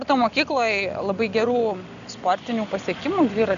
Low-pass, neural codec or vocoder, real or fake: 7.2 kHz; none; real